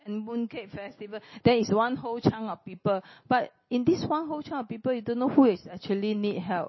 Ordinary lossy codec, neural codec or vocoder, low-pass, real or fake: MP3, 24 kbps; none; 7.2 kHz; real